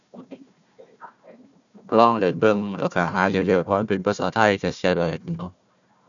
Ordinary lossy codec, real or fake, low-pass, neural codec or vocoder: none; fake; 7.2 kHz; codec, 16 kHz, 1 kbps, FunCodec, trained on Chinese and English, 50 frames a second